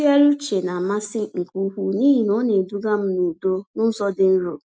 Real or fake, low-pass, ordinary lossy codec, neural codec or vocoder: real; none; none; none